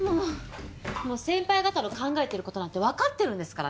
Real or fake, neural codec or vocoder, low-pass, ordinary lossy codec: real; none; none; none